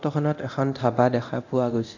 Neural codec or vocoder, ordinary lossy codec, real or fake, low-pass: codec, 24 kHz, 0.9 kbps, DualCodec; none; fake; 7.2 kHz